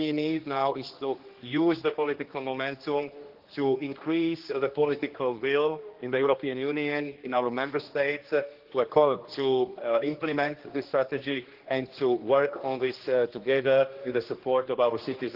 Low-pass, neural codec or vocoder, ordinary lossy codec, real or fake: 5.4 kHz; codec, 16 kHz, 2 kbps, X-Codec, HuBERT features, trained on general audio; Opus, 16 kbps; fake